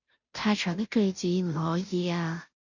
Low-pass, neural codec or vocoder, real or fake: 7.2 kHz; codec, 16 kHz, 0.5 kbps, FunCodec, trained on Chinese and English, 25 frames a second; fake